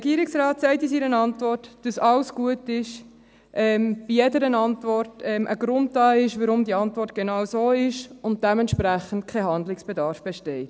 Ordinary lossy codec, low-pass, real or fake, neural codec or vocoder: none; none; real; none